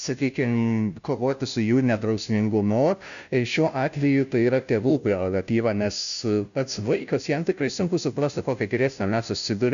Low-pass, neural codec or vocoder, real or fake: 7.2 kHz; codec, 16 kHz, 0.5 kbps, FunCodec, trained on Chinese and English, 25 frames a second; fake